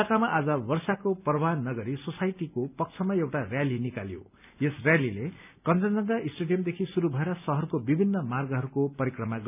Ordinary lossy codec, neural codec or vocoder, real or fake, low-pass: none; none; real; 3.6 kHz